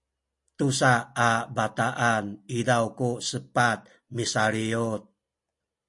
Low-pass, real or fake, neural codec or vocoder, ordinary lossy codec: 10.8 kHz; real; none; MP3, 48 kbps